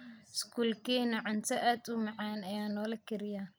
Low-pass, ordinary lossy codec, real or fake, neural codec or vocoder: none; none; real; none